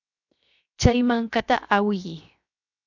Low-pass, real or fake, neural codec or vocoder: 7.2 kHz; fake; codec, 16 kHz, 0.7 kbps, FocalCodec